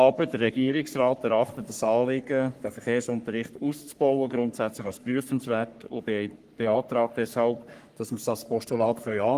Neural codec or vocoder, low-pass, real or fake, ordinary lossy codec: codec, 44.1 kHz, 3.4 kbps, Pupu-Codec; 14.4 kHz; fake; Opus, 24 kbps